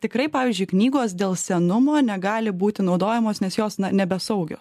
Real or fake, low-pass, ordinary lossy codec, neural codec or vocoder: real; 14.4 kHz; AAC, 96 kbps; none